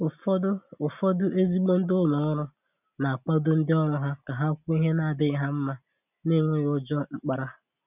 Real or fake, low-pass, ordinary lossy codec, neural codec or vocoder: real; 3.6 kHz; none; none